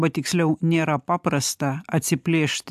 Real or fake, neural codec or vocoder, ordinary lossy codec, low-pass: real; none; AAC, 96 kbps; 14.4 kHz